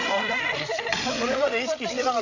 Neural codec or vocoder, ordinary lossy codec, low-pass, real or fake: codec, 16 kHz, 16 kbps, FreqCodec, larger model; none; 7.2 kHz; fake